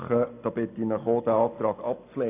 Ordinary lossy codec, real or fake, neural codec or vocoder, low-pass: AAC, 24 kbps; real; none; 3.6 kHz